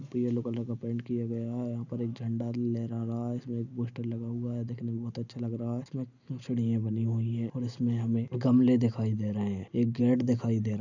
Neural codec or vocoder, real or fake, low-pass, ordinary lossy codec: none; real; 7.2 kHz; none